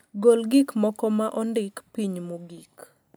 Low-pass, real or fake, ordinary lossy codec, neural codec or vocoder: none; real; none; none